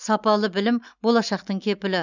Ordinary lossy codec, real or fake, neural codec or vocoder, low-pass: none; real; none; 7.2 kHz